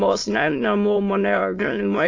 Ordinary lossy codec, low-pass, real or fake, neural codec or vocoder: AAC, 48 kbps; 7.2 kHz; fake; autoencoder, 22.05 kHz, a latent of 192 numbers a frame, VITS, trained on many speakers